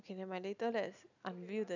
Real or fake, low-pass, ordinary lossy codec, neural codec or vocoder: real; 7.2 kHz; none; none